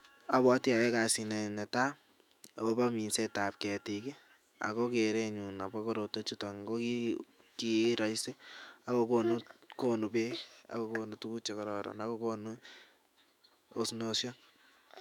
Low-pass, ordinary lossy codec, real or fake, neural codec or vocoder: 19.8 kHz; none; fake; autoencoder, 48 kHz, 128 numbers a frame, DAC-VAE, trained on Japanese speech